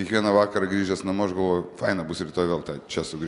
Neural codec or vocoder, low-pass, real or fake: none; 10.8 kHz; real